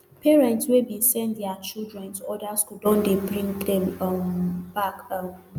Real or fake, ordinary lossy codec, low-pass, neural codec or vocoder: real; none; none; none